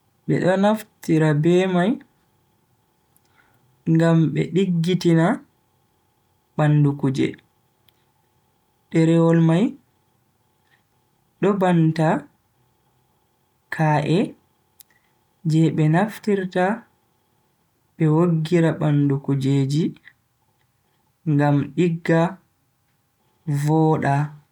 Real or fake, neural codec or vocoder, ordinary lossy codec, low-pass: real; none; none; 19.8 kHz